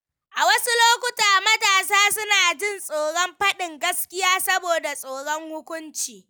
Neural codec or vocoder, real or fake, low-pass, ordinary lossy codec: none; real; none; none